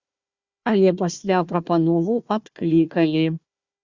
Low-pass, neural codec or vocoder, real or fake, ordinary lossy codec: 7.2 kHz; codec, 16 kHz, 1 kbps, FunCodec, trained on Chinese and English, 50 frames a second; fake; Opus, 64 kbps